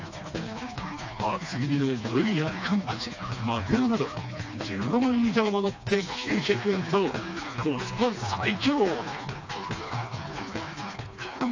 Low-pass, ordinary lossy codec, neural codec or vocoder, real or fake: 7.2 kHz; AAC, 48 kbps; codec, 16 kHz, 2 kbps, FreqCodec, smaller model; fake